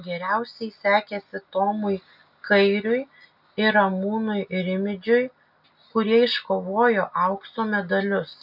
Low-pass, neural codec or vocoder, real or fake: 5.4 kHz; none; real